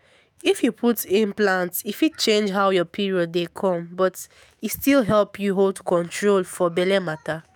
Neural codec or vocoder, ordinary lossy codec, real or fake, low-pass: autoencoder, 48 kHz, 128 numbers a frame, DAC-VAE, trained on Japanese speech; none; fake; none